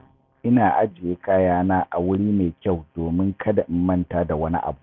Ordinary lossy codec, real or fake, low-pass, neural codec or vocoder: none; real; none; none